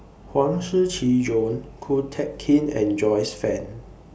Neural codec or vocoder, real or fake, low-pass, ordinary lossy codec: none; real; none; none